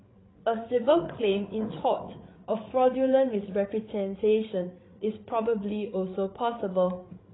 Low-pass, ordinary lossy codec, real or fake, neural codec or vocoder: 7.2 kHz; AAC, 16 kbps; fake; codec, 16 kHz, 8 kbps, FreqCodec, larger model